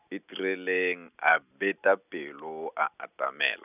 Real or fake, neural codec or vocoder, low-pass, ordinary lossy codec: real; none; 3.6 kHz; none